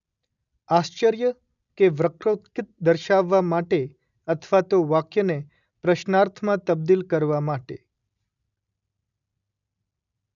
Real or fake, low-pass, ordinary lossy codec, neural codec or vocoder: real; 7.2 kHz; none; none